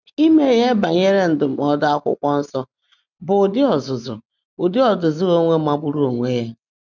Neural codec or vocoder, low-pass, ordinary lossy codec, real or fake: none; 7.2 kHz; none; real